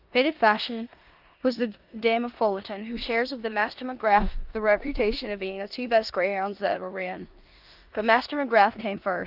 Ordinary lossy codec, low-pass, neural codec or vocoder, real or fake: Opus, 24 kbps; 5.4 kHz; codec, 16 kHz in and 24 kHz out, 0.9 kbps, LongCat-Audio-Codec, four codebook decoder; fake